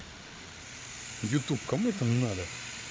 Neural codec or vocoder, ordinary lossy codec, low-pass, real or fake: codec, 16 kHz, 8 kbps, FreqCodec, larger model; none; none; fake